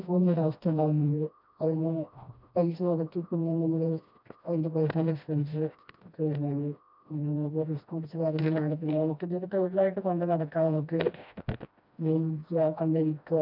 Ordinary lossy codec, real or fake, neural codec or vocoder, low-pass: none; fake; codec, 16 kHz, 1 kbps, FreqCodec, smaller model; 5.4 kHz